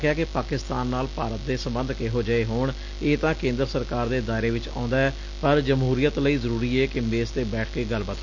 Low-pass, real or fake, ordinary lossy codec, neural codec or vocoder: 7.2 kHz; real; Opus, 64 kbps; none